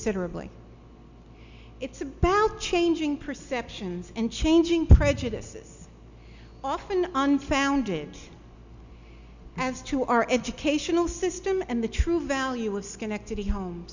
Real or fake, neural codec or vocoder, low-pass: real; none; 7.2 kHz